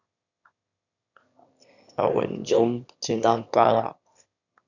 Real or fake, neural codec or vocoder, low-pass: fake; autoencoder, 22.05 kHz, a latent of 192 numbers a frame, VITS, trained on one speaker; 7.2 kHz